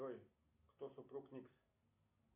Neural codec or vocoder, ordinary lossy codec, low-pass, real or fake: none; AAC, 32 kbps; 3.6 kHz; real